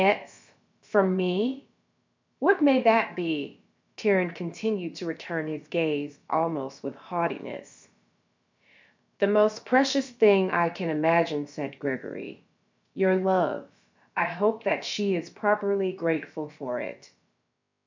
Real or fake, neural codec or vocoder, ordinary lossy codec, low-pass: fake; codec, 16 kHz, about 1 kbps, DyCAST, with the encoder's durations; MP3, 64 kbps; 7.2 kHz